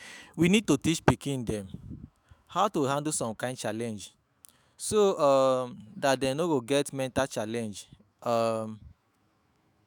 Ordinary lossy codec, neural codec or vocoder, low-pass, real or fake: none; autoencoder, 48 kHz, 128 numbers a frame, DAC-VAE, trained on Japanese speech; none; fake